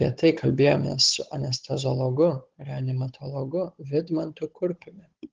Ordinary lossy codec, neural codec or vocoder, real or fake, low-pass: Opus, 16 kbps; codec, 16 kHz, 16 kbps, FunCodec, trained on Chinese and English, 50 frames a second; fake; 7.2 kHz